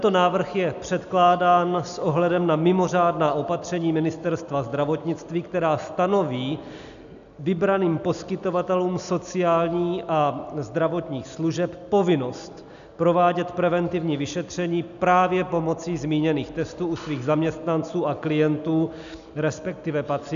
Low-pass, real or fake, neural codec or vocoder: 7.2 kHz; real; none